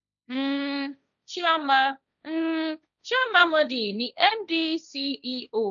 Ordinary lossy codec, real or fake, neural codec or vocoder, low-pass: none; fake; codec, 16 kHz, 1.1 kbps, Voila-Tokenizer; 7.2 kHz